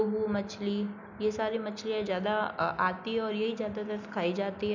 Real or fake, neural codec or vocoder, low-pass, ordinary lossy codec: real; none; 7.2 kHz; none